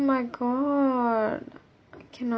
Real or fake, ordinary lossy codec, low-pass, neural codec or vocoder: real; none; none; none